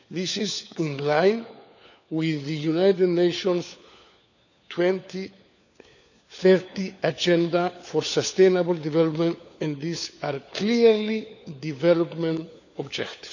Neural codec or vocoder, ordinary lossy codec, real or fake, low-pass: codec, 16 kHz, 4 kbps, FunCodec, trained on LibriTTS, 50 frames a second; none; fake; 7.2 kHz